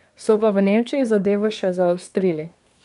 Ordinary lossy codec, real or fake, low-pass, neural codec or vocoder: none; fake; 10.8 kHz; codec, 24 kHz, 1 kbps, SNAC